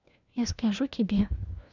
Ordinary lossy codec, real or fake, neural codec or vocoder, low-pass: none; fake; codec, 16 kHz, 1 kbps, FunCodec, trained on LibriTTS, 50 frames a second; 7.2 kHz